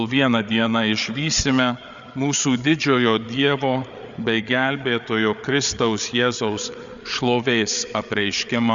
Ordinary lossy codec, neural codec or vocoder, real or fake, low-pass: Opus, 64 kbps; codec, 16 kHz, 8 kbps, FreqCodec, larger model; fake; 7.2 kHz